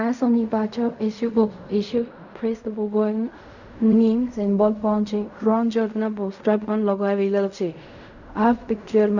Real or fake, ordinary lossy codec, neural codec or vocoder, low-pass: fake; none; codec, 16 kHz in and 24 kHz out, 0.4 kbps, LongCat-Audio-Codec, fine tuned four codebook decoder; 7.2 kHz